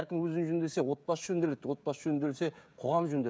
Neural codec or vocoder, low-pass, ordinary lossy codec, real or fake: codec, 16 kHz, 16 kbps, FreqCodec, smaller model; none; none; fake